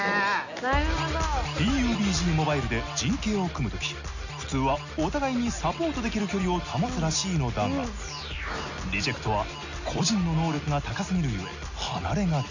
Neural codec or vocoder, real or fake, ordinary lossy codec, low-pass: none; real; none; 7.2 kHz